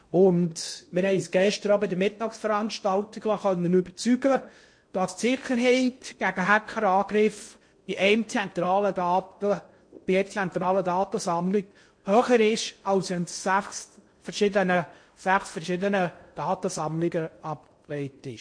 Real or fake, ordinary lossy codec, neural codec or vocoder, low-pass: fake; MP3, 48 kbps; codec, 16 kHz in and 24 kHz out, 0.6 kbps, FocalCodec, streaming, 2048 codes; 9.9 kHz